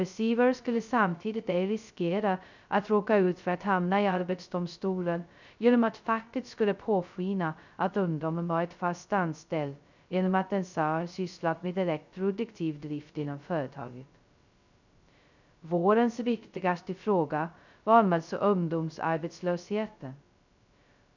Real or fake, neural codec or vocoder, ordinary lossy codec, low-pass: fake; codec, 16 kHz, 0.2 kbps, FocalCodec; none; 7.2 kHz